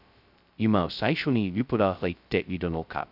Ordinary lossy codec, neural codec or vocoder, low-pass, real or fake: none; codec, 16 kHz, 0.2 kbps, FocalCodec; 5.4 kHz; fake